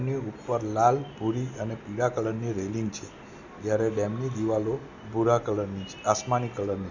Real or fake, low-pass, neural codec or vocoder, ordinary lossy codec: real; 7.2 kHz; none; none